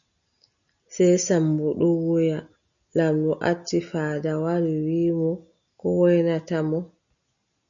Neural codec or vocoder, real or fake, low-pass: none; real; 7.2 kHz